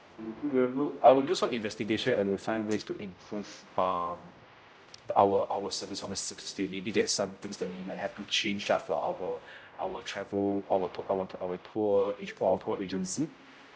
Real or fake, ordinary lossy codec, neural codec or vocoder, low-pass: fake; none; codec, 16 kHz, 0.5 kbps, X-Codec, HuBERT features, trained on general audio; none